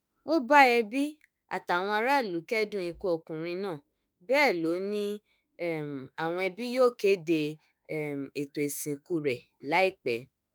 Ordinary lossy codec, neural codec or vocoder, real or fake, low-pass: none; autoencoder, 48 kHz, 32 numbers a frame, DAC-VAE, trained on Japanese speech; fake; none